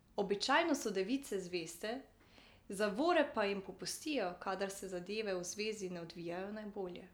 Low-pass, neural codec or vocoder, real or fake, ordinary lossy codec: none; none; real; none